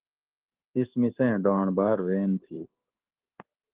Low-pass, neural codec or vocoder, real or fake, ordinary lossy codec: 3.6 kHz; codec, 16 kHz in and 24 kHz out, 1 kbps, XY-Tokenizer; fake; Opus, 16 kbps